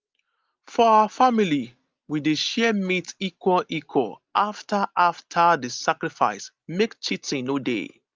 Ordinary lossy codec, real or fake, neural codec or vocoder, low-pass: Opus, 32 kbps; real; none; 7.2 kHz